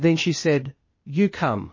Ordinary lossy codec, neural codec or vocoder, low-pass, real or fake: MP3, 32 kbps; codec, 16 kHz, 0.8 kbps, ZipCodec; 7.2 kHz; fake